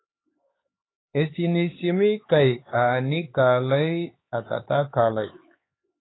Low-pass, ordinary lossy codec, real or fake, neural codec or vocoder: 7.2 kHz; AAC, 16 kbps; fake; codec, 16 kHz, 4 kbps, X-Codec, HuBERT features, trained on LibriSpeech